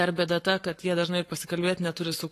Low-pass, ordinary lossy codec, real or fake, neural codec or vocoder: 14.4 kHz; AAC, 48 kbps; fake; codec, 44.1 kHz, 7.8 kbps, Pupu-Codec